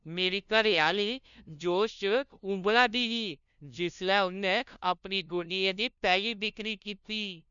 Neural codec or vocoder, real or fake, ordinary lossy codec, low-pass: codec, 16 kHz, 0.5 kbps, FunCodec, trained on LibriTTS, 25 frames a second; fake; none; 7.2 kHz